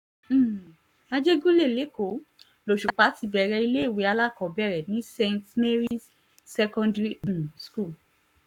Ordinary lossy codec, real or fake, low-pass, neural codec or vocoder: none; fake; 19.8 kHz; codec, 44.1 kHz, 7.8 kbps, Pupu-Codec